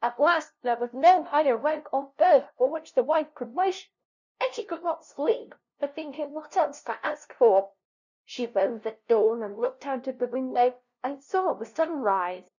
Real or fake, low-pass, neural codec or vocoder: fake; 7.2 kHz; codec, 16 kHz, 0.5 kbps, FunCodec, trained on LibriTTS, 25 frames a second